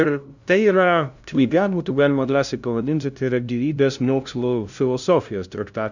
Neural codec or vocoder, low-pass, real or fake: codec, 16 kHz, 0.5 kbps, FunCodec, trained on LibriTTS, 25 frames a second; 7.2 kHz; fake